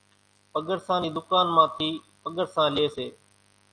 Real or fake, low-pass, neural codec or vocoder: real; 9.9 kHz; none